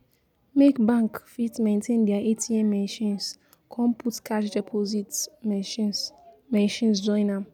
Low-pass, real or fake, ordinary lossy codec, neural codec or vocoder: 19.8 kHz; real; none; none